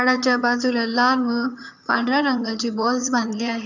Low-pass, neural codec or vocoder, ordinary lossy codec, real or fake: 7.2 kHz; vocoder, 22.05 kHz, 80 mel bands, HiFi-GAN; none; fake